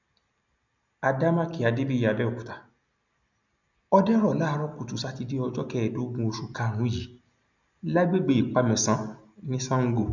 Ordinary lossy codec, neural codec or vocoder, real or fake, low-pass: none; none; real; 7.2 kHz